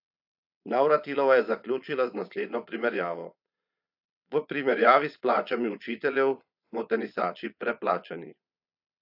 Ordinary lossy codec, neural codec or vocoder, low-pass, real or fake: AAC, 48 kbps; vocoder, 22.05 kHz, 80 mel bands, Vocos; 5.4 kHz; fake